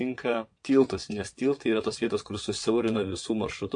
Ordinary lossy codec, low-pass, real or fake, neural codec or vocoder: MP3, 48 kbps; 9.9 kHz; fake; vocoder, 22.05 kHz, 80 mel bands, WaveNeXt